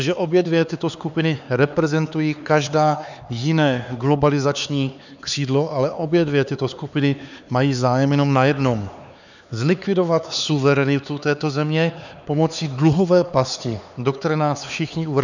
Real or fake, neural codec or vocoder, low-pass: fake; codec, 16 kHz, 4 kbps, X-Codec, HuBERT features, trained on LibriSpeech; 7.2 kHz